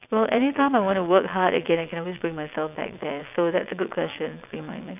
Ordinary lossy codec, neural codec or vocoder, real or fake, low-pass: none; vocoder, 22.05 kHz, 80 mel bands, WaveNeXt; fake; 3.6 kHz